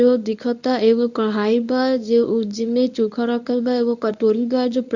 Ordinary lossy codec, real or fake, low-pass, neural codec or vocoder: none; fake; 7.2 kHz; codec, 24 kHz, 0.9 kbps, WavTokenizer, medium speech release version 1